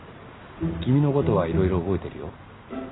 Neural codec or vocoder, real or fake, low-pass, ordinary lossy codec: none; real; 7.2 kHz; AAC, 16 kbps